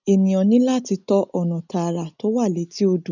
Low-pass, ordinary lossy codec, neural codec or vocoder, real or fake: 7.2 kHz; none; none; real